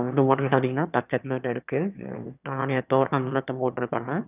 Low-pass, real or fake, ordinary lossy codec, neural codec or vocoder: 3.6 kHz; fake; none; autoencoder, 22.05 kHz, a latent of 192 numbers a frame, VITS, trained on one speaker